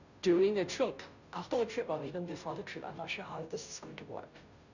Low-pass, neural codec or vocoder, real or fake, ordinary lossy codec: 7.2 kHz; codec, 16 kHz, 0.5 kbps, FunCodec, trained on Chinese and English, 25 frames a second; fake; none